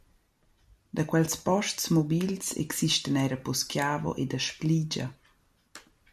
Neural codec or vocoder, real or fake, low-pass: none; real; 14.4 kHz